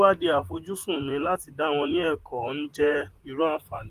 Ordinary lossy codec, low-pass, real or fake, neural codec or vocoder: Opus, 24 kbps; 14.4 kHz; fake; vocoder, 44.1 kHz, 128 mel bands, Pupu-Vocoder